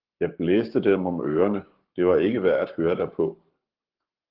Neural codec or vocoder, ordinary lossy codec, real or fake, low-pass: codec, 44.1 kHz, 7.8 kbps, Pupu-Codec; Opus, 16 kbps; fake; 5.4 kHz